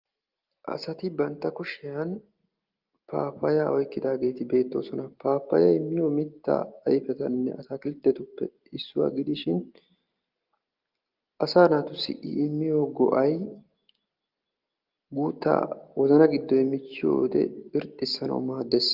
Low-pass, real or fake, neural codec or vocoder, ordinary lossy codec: 5.4 kHz; real; none; Opus, 32 kbps